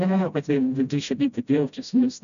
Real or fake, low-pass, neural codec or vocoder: fake; 7.2 kHz; codec, 16 kHz, 0.5 kbps, FreqCodec, smaller model